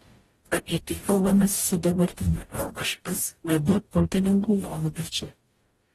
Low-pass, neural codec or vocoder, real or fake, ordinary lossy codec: 19.8 kHz; codec, 44.1 kHz, 0.9 kbps, DAC; fake; AAC, 32 kbps